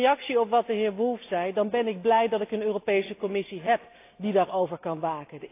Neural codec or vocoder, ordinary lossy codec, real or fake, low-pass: none; AAC, 24 kbps; real; 3.6 kHz